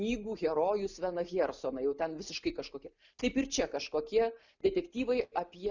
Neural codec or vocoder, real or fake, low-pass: none; real; 7.2 kHz